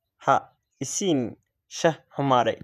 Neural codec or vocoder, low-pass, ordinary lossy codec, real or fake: vocoder, 48 kHz, 128 mel bands, Vocos; 14.4 kHz; none; fake